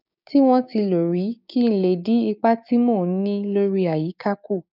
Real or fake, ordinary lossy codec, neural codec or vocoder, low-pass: fake; none; codec, 16 kHz, 6 kbps, DAC; 5.4 kHz